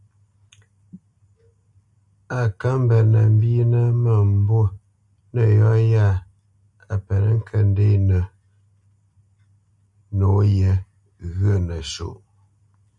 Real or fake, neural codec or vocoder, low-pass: real; none; 10.8 kHz